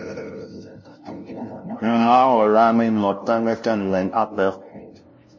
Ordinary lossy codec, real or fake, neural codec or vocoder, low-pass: MP3, 32 kbps; fake; codec, 16 kHz, 0.5 kbps, FunCodec, trained on LibriTTS, 25 frames a second; 7.2 kHz